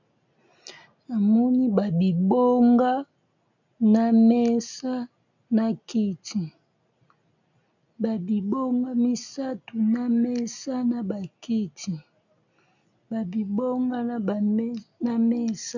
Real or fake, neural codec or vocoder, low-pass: real; none; 7.2 kHz